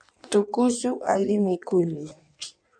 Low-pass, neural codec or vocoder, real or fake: 9.9 kHz; codec, 16 kHz in and 24 kHz out, 1.1 kbps, FireRedTTS-2 codec; fake